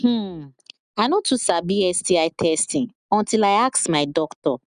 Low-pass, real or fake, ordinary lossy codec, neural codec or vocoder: 9.9 kHz; real; none; none